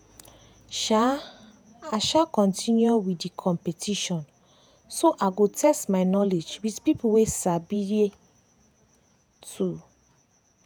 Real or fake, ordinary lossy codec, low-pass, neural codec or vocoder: fake; none; none; vocoder, 48 kHz, 128 mel bands, Vocos